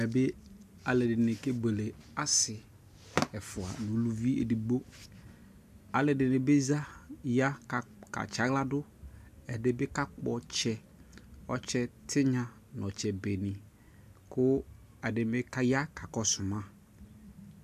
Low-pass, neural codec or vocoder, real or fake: 14.4 kHz; none; real